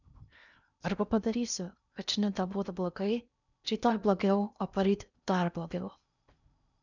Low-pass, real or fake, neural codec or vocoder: 7.2 kHz; fake; codec, 16 kHz in and 24 kHz out, 0.8 kbps, FocalCodec, streaming, 65536 codes